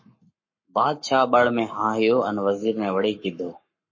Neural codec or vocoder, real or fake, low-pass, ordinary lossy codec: codec, 44.1 kHz, 7.8 kbps, Pupu-Codec; fake; 7.2 kHz; MP3, 32 kbps